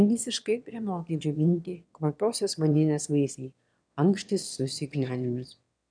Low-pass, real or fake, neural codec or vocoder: 9.9 kHz; fake; autoencoder, 22.05 kHz, a latent of 192 numbers a frame, VITS, trained on one speaker